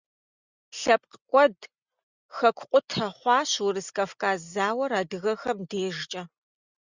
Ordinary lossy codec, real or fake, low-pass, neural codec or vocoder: Opus, 64 kbps; real; 7.2 kHz; none